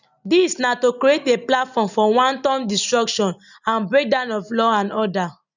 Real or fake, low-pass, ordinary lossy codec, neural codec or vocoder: real; 7.2 kHz; none; none